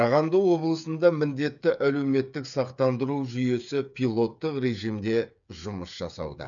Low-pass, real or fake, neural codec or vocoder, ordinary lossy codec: 7.2 kHz; fake; codec, 16 kHz, 8 kbps, FreqCodec, smaller model; none